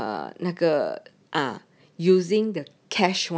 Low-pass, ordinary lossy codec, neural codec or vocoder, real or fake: none; none; none; real